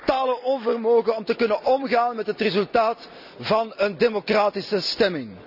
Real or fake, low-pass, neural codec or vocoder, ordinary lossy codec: real; 5.4 kHz; none; none